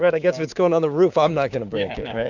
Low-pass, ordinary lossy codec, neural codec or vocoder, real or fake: 7.2 kHz; Opus, 64 kbps; codec, 16 kHz, 6 kbps, DAC; fake